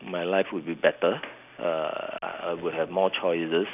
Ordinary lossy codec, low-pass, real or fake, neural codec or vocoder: none; 3.6 kHz; real; none